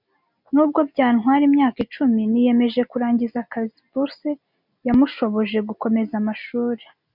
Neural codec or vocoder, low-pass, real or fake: none; 5.4 kHz; real